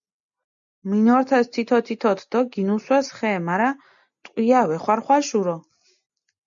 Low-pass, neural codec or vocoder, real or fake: 7.2 kHz; none; real